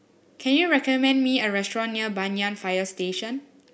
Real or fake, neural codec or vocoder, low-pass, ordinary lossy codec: real; none; none; none